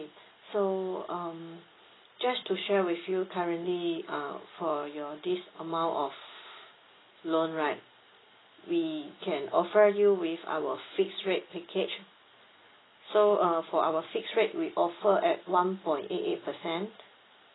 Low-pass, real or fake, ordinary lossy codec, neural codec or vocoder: 7.2 kHz; real; AAC, 16 kbps; none